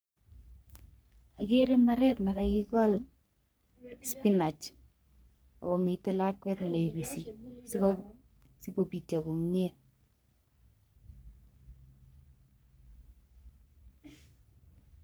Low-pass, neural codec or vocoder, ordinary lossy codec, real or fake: none; codec, 44.1 kHz, 3.4 kbps, Pupu-Codec; none; fake